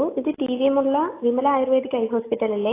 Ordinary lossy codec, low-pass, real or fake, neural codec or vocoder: AAC, 24 kbps; 3.6 kHz; real; none